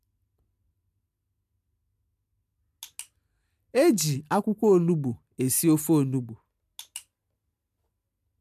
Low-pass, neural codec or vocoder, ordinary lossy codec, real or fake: 14.4 kHz; none; MP3, 96 kbps; real